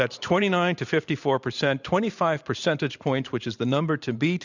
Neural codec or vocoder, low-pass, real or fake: vocoder, 44.1 kHz, 128 mel bands every 512 samples, BigVGAN v2; 7.2 kHz; fake